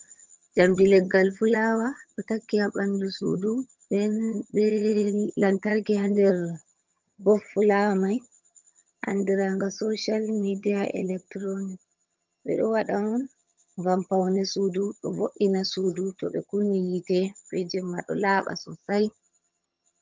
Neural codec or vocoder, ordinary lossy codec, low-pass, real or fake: vocoder, 22.05 kHz, 80 mel bands, HiFi-GAN; Opus, 24 kbps; 7.2 kHz; fake